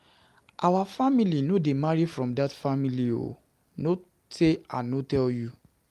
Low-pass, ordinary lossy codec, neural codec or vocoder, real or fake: 14.4 kHz; Opus, 32 kbps; none; real